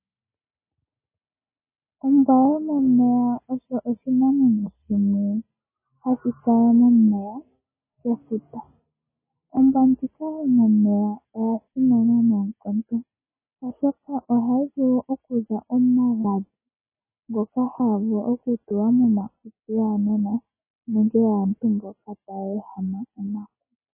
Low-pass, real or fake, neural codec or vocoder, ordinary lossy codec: 3.6 kHz; real; none; MP3, 16 kbps